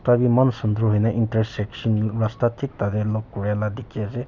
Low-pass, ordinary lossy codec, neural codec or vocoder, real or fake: 7.2 kHz; none; none; real